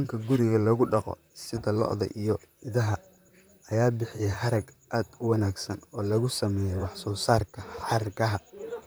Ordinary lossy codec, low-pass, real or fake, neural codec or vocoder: none; none; fake; vocoder, 44.1 kHz, 128 mel bands, Pupu-Vocoder